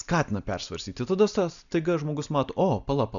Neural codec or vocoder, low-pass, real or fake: none; 7.2 kHz; real